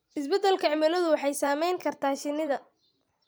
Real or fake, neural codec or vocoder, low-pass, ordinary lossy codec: fake; vocoder, 44.1 kHz, 128 mel bands every 512 samples, BigVGAN v2; none; none